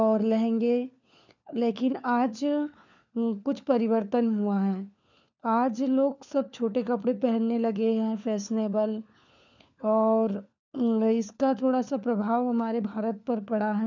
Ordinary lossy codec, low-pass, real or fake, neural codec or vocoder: none; 7.2 kHz; fake; codec, 16 kHz, 4 kbps, FunCodec, trained on LibriTTS, 50 frames a second